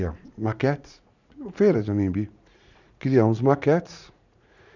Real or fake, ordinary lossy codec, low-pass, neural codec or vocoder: fake; none; 7.2 kHz; vocoder, 44.1 kHz, 80 mel bands, Vocos